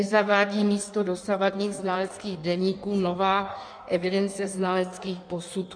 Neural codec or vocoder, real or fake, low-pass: codec, 16 kHz in and 24 kHz out, 1.1 kbps, FireRedTTS-2 codec; fake; 9.9 kHz